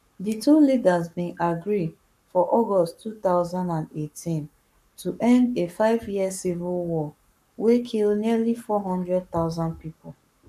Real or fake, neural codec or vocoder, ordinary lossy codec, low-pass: fake; codec, 44.1 kHz, 7.8 kbps, Pupu-Codec; none; 14.4 kHz